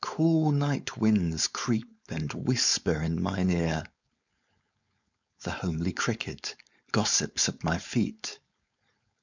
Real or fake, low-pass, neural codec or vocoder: fake; 7.2 kHz; codec, 16 kHz, 4.8 kbps, FACodec